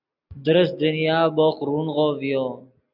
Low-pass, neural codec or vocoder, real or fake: 5.4 kHz; none; real